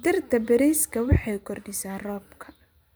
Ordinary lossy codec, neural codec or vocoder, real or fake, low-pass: none; vocoder, 44.1 kHz, 128 mel bands every 512 samples, BigVGAN v2; fake; none